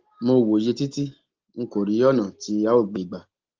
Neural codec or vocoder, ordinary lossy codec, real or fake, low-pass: none; Opus, 16 kbps; real; 7.2 kHz